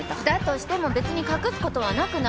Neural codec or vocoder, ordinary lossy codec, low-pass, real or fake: none; none; none; real